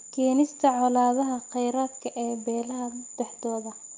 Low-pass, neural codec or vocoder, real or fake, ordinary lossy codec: 7.2 kHz; none; real; Opus, 32 kbps